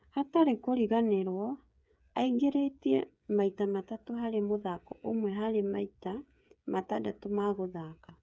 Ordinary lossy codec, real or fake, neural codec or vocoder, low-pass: none; fake; codec, 16 kHz, 8 kbps, FreqCodec, smaller model; none